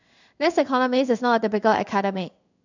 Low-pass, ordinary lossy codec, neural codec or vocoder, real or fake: 7.2 kHz; none; codec, 16 kHz in and 24 kHz out, 1 kbps, XY-Tokenizer; fake